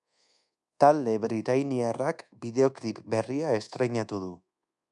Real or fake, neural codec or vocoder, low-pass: fake; codec, 24 kHz, 1.2 kbps, DualCodec; 10.8 kHz